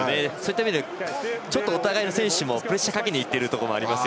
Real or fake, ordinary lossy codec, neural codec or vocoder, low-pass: real; none; none; none